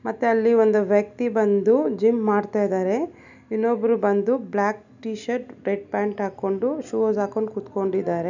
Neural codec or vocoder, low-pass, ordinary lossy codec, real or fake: none; 7.2 kHz; none; real